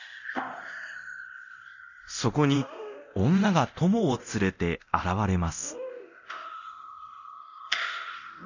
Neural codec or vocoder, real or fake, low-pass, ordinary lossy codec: codec, 24 kHz, 0.9 kbps, DualCodec; fake; 7.2 kHz; none